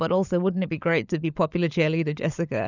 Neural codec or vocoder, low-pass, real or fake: codec, 16 kHz, 16 kbps, FunCodec, trained on LibriTTS, 50 frames a second; 7.2 kHz; fake